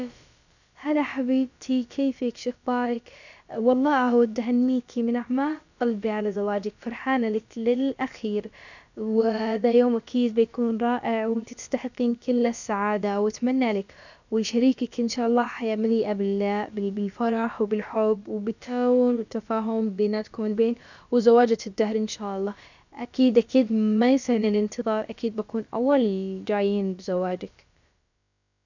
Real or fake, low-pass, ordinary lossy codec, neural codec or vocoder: fake; 7.2 kHz; none; codec, 16 kHz, about 1 kbps, DyCAST, with the encoder's durations